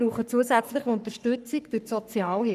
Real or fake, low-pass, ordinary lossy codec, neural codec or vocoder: fake; 14.4 kHz; none; codec, 44.1 kHz, 3.4 kbps, Pupu-Codec